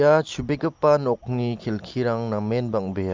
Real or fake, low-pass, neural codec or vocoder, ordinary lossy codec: real; 7.2 kHz; none; Opus, 32 kbps